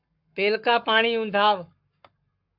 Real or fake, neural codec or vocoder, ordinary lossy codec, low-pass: fake; codec, 44.1 kHz, 7.8 kbps, Pupu-Codec; AAC, 48 kbps; 5.4 kHz